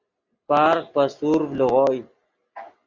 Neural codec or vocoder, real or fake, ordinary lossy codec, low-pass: none; real; Opus, 64 kbps; 7.2 kHz